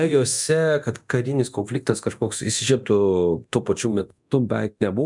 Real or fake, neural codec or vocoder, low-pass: fake; codec, 24 kHz, 0.9 kbps, DualCodec; 10.8 kHz